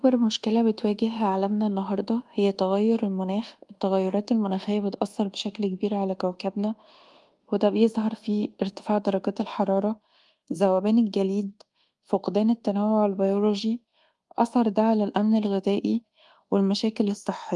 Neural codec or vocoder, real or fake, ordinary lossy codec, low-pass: codec, 24 kHz, 1.2 kbps, DualCodec; fake; Opus, 24 kbps; 10.8 kHz